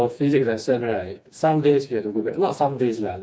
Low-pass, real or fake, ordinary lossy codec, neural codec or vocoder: none; fake; none; codec, 16 kHz, 2 kbps, FreqCodec, smaller model